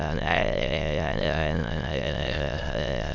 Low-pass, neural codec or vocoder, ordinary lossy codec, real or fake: 7.2 kHz; autoencoder, 22.05 kHz, a latent of 192 numbers a frame, VITS, trained on many speakers; MP3, 64 kbps; fake